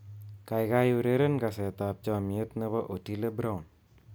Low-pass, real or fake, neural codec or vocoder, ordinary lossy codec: none; real; none; none